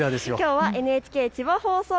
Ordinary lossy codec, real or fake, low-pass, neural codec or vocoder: none; real; none; none